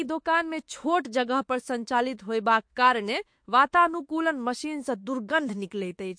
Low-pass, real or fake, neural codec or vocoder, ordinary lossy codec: 9.9 kHz; fake; autoencoder, 48 kHz, 32 numbers a frame, DAC-VAE, trained on Japanese speech; MP3, 48 kbps